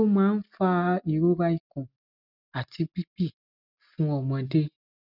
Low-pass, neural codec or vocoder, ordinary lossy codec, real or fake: 5.4 kHz; none; none; real